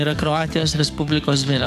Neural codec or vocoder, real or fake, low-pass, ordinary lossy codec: codec, 44.1 kHz, 7.8 kbps, DAC; fake; 14.4 kHz; MP3, 96 kbps